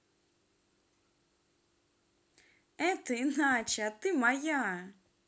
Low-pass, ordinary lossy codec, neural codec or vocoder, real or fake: none; none; none; real